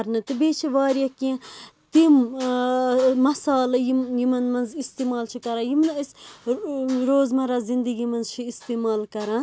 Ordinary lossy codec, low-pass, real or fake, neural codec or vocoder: none; none; real; none